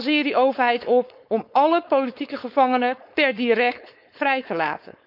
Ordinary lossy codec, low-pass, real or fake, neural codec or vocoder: none; 5.4 kHz; fake; codec, 16 kHz, 4.8 kbps, FACodec